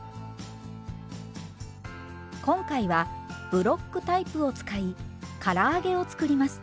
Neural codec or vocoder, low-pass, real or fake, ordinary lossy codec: none; none; real; none